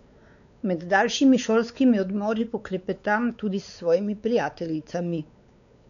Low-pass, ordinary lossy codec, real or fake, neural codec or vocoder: 7.2 kHz; none; fake; codec, 16 kHz, 4 kbps, X-Codec, WavLM features, trained on Multilingual LibriSpeech